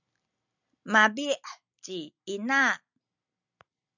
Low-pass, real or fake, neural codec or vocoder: 7.2 kHz; real; none